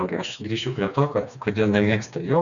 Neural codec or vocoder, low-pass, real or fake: codec, 16 kHz, 2 kbps, FreqCodec, smaller model; 7.2 kHz; fake